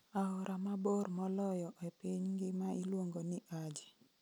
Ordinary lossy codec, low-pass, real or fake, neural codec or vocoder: none; none; real; none